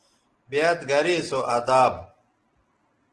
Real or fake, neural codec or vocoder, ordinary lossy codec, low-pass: real; none; Opus, 16 kbps; 10.8 kHz